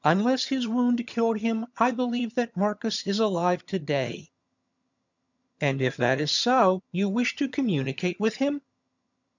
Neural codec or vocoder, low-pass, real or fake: vocoder, 22.05 kHz, 80 mel bands, HiFi-GAN; 7.2 kHz; fake